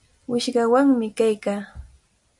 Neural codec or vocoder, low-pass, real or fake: none; 10.8 kHz; real